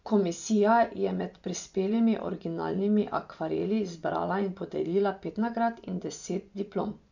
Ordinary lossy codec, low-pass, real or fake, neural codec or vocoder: none; 7.2 kHz; fake; vocoder, 24 kHz, 100 mel bands, Vocos